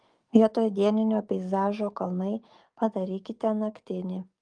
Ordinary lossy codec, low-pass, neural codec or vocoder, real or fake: Opus, 32 kbps; 9.9 kHz; codec, 44.1 kHz, 7.8 kbps, DAC; fake